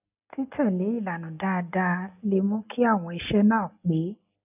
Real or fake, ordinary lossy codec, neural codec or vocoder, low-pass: real; none; none; 3.6 kHz